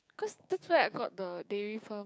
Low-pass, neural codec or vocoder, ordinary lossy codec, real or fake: none; codec, 16 kHz, 6 kbps, DAC; none; fake